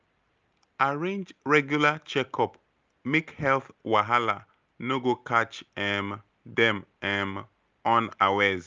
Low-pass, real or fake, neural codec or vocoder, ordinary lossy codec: 7.2 kHz; real; none; Opus, 24 kbps